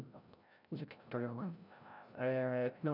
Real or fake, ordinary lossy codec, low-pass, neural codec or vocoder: fake; none; 5.4 kHz; codec, 16 kHz, 0.5 kbps, FreqCodec, larger model